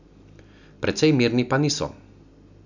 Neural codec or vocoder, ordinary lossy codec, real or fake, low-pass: none; none; real; 7.2 kHz